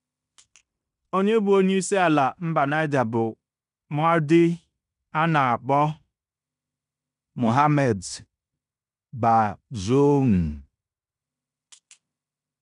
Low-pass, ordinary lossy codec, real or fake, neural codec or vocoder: 10.8 kHz; none; fake; codec, 16 kHz in and 24 kHz out, 0.9 kbps, LongCat-Audio-Codec, fine tuned four codebook decoder